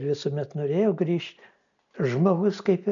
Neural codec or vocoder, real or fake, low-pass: none; real; 7.2 kHz